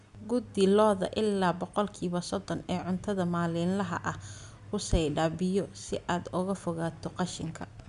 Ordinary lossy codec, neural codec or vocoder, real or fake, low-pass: none; none; real; 10.8 kHz